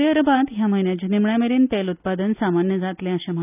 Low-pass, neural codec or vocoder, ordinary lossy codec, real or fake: 3.6 kHz; none; none; real